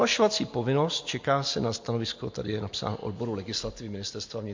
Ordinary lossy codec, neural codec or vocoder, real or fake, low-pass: MP3, 48 kbps; none; real; 7.2 kHz